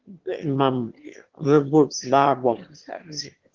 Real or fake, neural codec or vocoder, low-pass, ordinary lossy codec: fake; autoencoder, 22.05 kHz, a latent of 192 numbers a frame, VITS, trained on one speaker; 7.2 kHz; Opus, 16 kbps